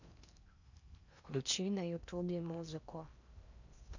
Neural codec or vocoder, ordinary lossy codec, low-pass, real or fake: codec, 16 kHz in and 24 kHz out, 0.6 kbps, FocalCodec, streaming, 4096 codes; none; 7.2 kHz; fake